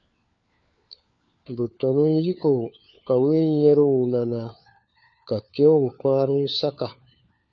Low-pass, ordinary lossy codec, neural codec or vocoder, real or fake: 7.2 kHz; MP3, 48 kbps; codec, 16 kHz, 4 kbps, FunCodec, trained on LibriTTS, 50 frames a second; fake